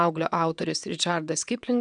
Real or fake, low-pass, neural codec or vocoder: fake; 9.9 kHz; vocoder, 22.05 kHz, 80 mel bands, WaveNeXt